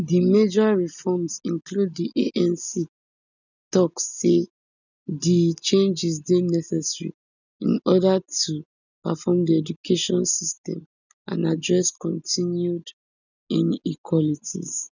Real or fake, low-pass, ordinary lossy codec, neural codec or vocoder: real; 7.2 kHz; none; none